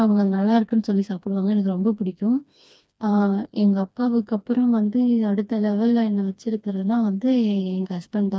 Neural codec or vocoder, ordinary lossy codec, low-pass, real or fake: codec, 16 kHz, 2 kbps, FreqCodec, smaller model; none; none; fake